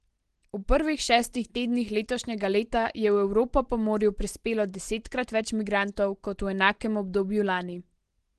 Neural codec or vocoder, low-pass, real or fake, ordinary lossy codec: none; 10.8 kHz; real; Opus, 16 kbps